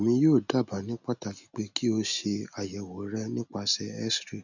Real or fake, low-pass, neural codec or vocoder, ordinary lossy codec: real; 7.2 kHz; none; none